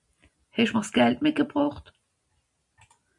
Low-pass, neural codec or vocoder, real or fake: 10.8 kHz; none; real